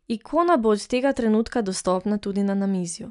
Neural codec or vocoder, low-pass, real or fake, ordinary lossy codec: none; 10.8 kHz; real; none